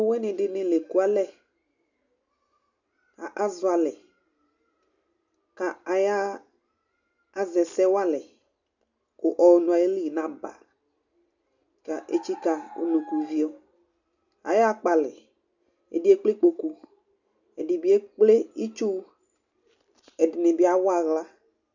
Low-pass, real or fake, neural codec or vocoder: 7.2 kHz; real; none